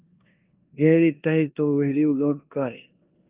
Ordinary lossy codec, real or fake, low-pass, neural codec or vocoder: Opus, 24 kbps; fake; 3.6 kHz; codec, 16 kHz in and 24 kHz out, 0.9 kbps, LongCat-Audio-Codec, four codebook decoder